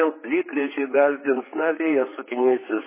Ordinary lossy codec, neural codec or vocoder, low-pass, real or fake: MP3, 16 kbps; codec, 16 kHz, 4 kbps, X-Codec, HuBERT features, trained on general audio; 3.6 kHz; fake